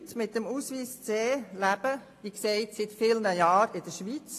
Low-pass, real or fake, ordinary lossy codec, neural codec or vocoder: 14.4 kHz; real; AAC, 48 kbps; none